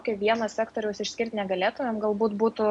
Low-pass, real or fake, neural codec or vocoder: 10.8 kHz; real; none